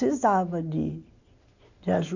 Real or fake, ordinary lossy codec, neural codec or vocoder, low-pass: real; none; none; 7.2 kHz